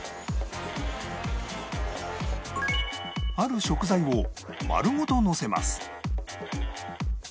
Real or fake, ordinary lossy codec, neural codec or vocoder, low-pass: real; none; none; none